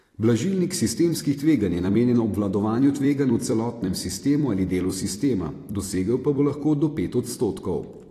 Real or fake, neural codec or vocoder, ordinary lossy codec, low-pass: fake; vocoder, 44.1 kHz, 128 mel bands every 256 samples, BigVGAN v2; AAC, 48 kbps; 14.4 kHz